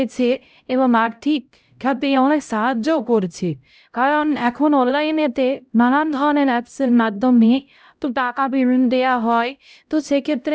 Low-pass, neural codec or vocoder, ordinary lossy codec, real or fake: none; codec, 16 kHz, 0.5 kbps, X-Codec, HuBERT features, trained on LibriSpeech; none; fake